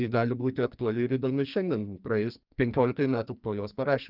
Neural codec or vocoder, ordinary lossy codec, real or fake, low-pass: codec, 44.1 kHz, 2.6 kbps, SNAC; Opus, 24 kbps; fake; 5.4 kHz